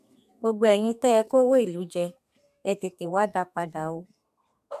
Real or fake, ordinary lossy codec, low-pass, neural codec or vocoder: fake; none; 14.4 kHz; codec, 32 kHz, 1.9 kbps, SNAC